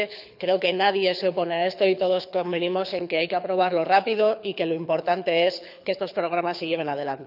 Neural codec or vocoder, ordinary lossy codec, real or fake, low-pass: codec, 24 kHz, 6 kbps, HILCodec; none; fake; 5.4 kHz